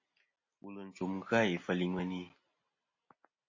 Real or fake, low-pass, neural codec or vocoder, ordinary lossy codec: real; 7.2 kHz; none; MP3, 32 kbps